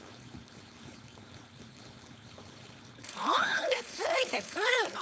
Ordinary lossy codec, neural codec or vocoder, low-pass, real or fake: none; codec, 16 kHz, 4.8 kbps, FACodec; none; fake